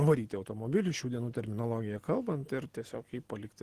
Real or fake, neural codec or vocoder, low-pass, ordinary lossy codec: real; none; 14.4 kHz; Opus, 16 kbps